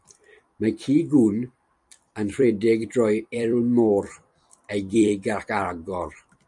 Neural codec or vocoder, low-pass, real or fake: vocoder, 44.1 kHz, 128 mel bands every 256 samples, BigVGAN v2; 10.8 kHz; fake